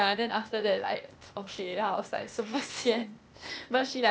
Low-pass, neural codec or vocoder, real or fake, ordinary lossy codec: none; codec, 16 kHz, 0.8 kbps, ZipCodec; fake; none